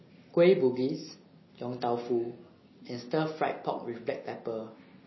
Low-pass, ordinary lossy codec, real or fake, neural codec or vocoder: 7.2 kHz; MP3, 24 kbps; real; none